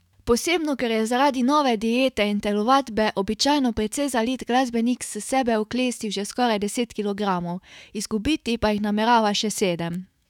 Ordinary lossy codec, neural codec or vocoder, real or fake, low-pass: none; autoencoder, 48 kHz, 128 numbers a frame, DAC-VAE, trained on Japanese speech; fake; 19.8 kHz